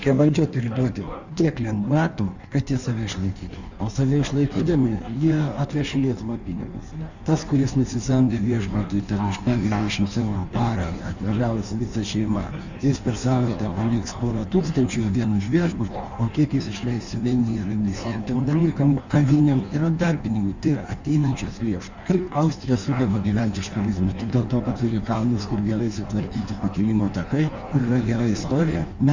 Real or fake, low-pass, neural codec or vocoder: fake; 7.2 kHz; codec, 16 kHz in and 24 kHz out, 1.1 kbps, FireRedTTS-2 codec